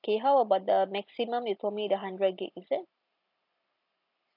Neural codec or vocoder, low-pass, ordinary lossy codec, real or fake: none; 5.4 kHz; AAC, 48 kbps; real